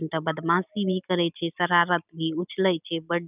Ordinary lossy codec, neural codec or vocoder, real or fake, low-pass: none; none; real; 3.6 kHz